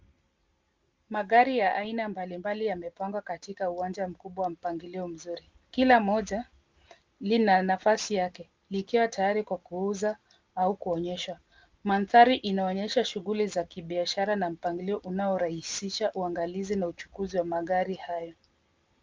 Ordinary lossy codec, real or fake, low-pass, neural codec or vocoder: Opus, 32 kbps; real; 7.2 kHz; none